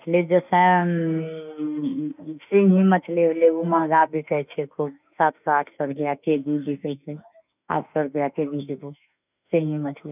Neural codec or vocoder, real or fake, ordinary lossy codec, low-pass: autoencoder, 48 kHz, 32 numbers a frame, DAC-VAE, trained on Japanese speech; fake; none; 3.6 kHz